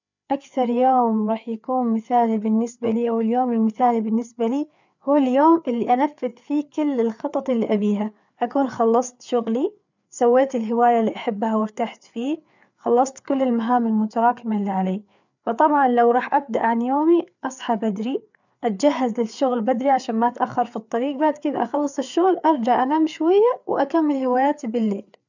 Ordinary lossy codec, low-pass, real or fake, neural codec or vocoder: none; 7.2 kHz; fake; codec, 16 kHz, 4 kbps, FreqCodec, larger model